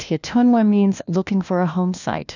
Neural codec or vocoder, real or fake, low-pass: codec, 16 kHz, 1 kbps, FunCodec, trained on LibriTTS, 50 frames a second; fake; 7.2 kHz